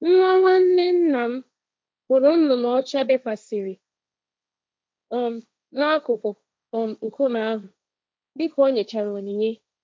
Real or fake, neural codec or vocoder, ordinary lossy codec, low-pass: fake; codec, 16 kHz, 1.1 kbps, Voila-Tokenizer; none; none